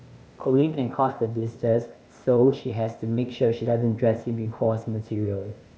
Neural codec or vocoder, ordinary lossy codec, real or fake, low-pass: codec, 16 kHz, 0.8 kbps, ZipCodec; none; fake; none